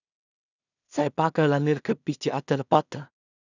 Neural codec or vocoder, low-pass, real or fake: codec, 16 kHz in and 24 kHz out, 0.4 kbps, LongCat-Audio-Codec, two codebook decoder; 7.2 kHz; fake